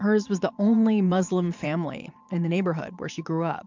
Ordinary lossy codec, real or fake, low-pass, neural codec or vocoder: MP3, 64 kbps; fake; 7.2 kHz; vocoder, 22.05 kHz, 80 mel bands, Vocos